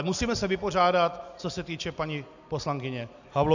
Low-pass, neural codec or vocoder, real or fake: 7.2 kHz; none; real